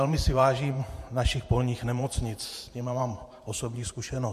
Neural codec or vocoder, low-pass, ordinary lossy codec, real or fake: vocoder, 48 kHz, 128 mel bands, Vocos; 14.4 kHz; MP3, 64 kbps; fake